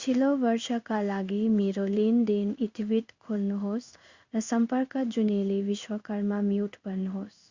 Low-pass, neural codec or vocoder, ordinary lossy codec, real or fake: 7.2 kHz; codec, 16 kHz in and 24 kHz out, 1 kbps, XY-Tokenizer; Opus, 64 kbps; fake